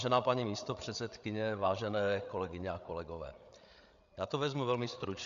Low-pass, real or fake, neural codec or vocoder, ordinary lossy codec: 7.2 kHz; fake; codec, 16 kHz, 8 kbps, FreqCodec, larger model; MP3, 96 kbps